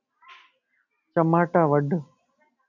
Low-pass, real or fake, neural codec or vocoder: 7.2 kHz; real; none